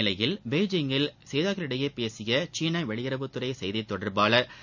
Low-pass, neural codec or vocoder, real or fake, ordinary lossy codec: 7.2 kHz; none; real; none